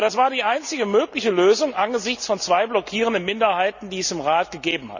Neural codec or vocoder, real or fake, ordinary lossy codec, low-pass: none; real; none; 7.2 kHz